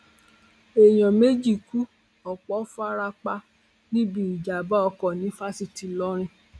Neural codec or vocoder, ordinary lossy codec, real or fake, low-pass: none; none; real; none